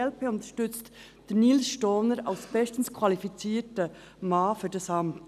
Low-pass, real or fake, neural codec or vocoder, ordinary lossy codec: 14.4 kHz; real; none; none